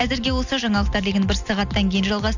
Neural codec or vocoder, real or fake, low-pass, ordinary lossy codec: none; real; 7.2 kHz; none